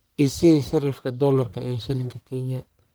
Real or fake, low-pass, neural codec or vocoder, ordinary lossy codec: fake; none; codec, 44.1 kHz, 1.7 kbps, Pupu-Codec; none